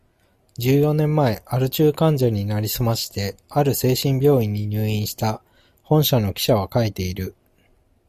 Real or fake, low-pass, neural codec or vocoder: real; 14.4 kHz; none